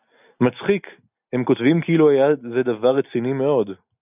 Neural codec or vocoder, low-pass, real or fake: none; 3.6 kHz; real